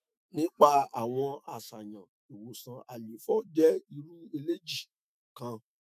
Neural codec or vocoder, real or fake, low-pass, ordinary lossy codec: autoencoder, 48 kHz, 128 numbers a frame, DAC-VAE, trained on Japanese speech; fake; 14.4 kHz; none